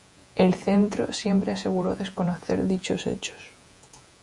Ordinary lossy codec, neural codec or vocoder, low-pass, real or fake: Opus, 64 kbps; vocoder, 48 kHz, 128 mel bands, Vocos; 10.8 kHz; fake